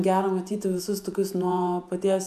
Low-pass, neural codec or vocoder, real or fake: 14.4 kHz; vocoder, 48 kHz, 128 mel bands, Vocos; fake